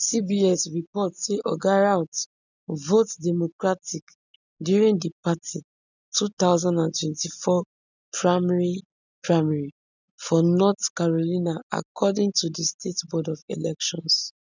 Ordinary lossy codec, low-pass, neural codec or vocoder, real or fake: none; 7.2 kHz; none; real